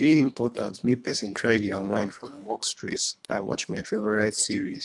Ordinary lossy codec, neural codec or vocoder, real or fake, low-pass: none; codec, 24 kHz, 1.5 kbps, HILCodec; fake; 10.8 kHz